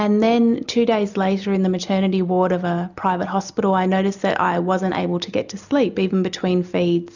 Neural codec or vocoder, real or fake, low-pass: none; real; 7.2 kHz